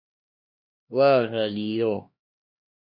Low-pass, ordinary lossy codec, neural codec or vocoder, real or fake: 5.4 kHz; MP3, 32 kbps; codec, 16 kHz, 2 kbps, X-Codec, HuBERT features, trained on LibriSpeech; fake